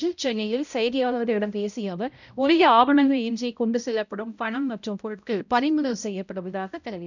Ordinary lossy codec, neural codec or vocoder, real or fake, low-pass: none; codec, 16 kHz, 0.5 kbps, X-Codec, HuBERT features, trained on balanced general audio; fake; 7.2 kHz